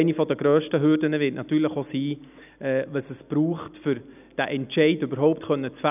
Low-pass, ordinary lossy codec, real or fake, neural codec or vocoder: 3.6 kHz; none; real; none